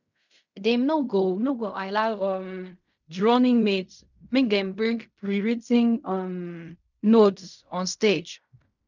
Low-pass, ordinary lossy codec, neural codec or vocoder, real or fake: 7.2 kHz; none; codec, 16 kHz in and 24 kHz out, 0.4 kbps, LongCat-Audio-Codec, fine tuned four codebook decoder; fake